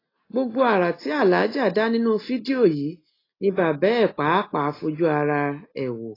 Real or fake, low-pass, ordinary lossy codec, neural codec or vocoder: real; 5.4 kHz; AAC, 24 kbps; none